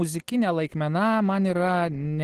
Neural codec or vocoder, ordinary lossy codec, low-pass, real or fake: none; Opus, 16 kbps; 14.4 kHz; real